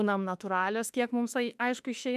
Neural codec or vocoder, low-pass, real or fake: autoencoder, 48 kHz, 32 numbers a frame, DAC-VAE, trained on Japanese speech; 14.4 kHz; fake